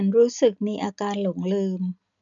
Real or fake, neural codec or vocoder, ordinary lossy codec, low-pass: real; none; none; 7.2 kHz